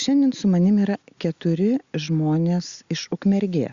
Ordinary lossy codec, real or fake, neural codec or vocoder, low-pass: Opus, 64 kbps; real; none; 7.2 kHz